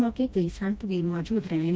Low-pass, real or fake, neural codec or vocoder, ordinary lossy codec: none; fake; codec, 16 kHz, 1 kbps, FreqCodec, smaller model; none